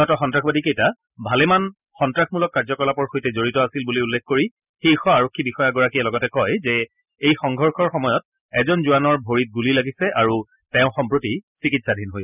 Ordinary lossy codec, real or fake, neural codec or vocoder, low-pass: none; real; none; 3.6 kHz